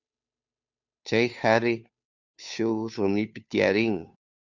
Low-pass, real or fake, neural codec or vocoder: 7.2 kHz; fake; codec, 16 kHz, 2 kbps, FunCodec, trained on Chinese and English, 25 frames a second